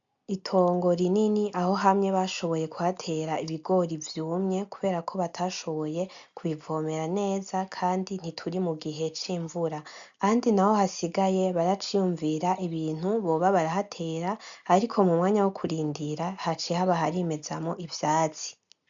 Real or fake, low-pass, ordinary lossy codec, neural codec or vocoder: real; 7.2 kHz; MP3, 64 kbps; none